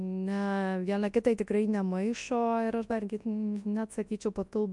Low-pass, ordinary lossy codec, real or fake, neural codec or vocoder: 10.8 kHz; MP3, 64 kbps; fake; codec, 24 kHz, 0.9 kbps, WavTokenizer, large speech release